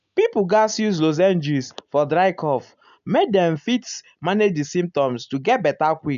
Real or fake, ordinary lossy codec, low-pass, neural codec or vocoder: real; none; 7.2 kHz; none